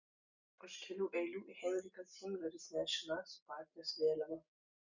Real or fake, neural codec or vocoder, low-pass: real; none; 7.2 kHz